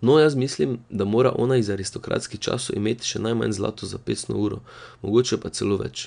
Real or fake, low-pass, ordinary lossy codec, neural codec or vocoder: real; 9.9 kHz; none; none